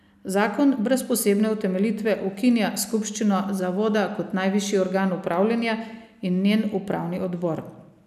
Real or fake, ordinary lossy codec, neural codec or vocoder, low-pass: real; none; none; 14.4 kHz